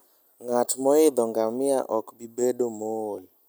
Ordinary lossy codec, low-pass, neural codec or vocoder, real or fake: none; none; none; real